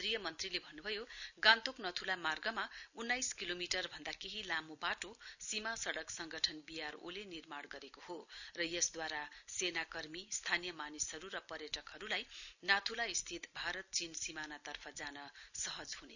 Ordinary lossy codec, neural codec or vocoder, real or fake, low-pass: none; none; real; 7.2 kHz